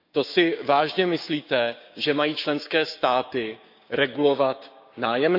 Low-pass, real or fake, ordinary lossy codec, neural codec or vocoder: 5.4 kHz; fake; none; codec, 16 kHz, 6 kbps, DAC